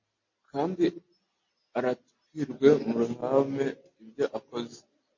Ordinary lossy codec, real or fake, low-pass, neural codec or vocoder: MP3, 32 kbps; real; 7.2 kHz; none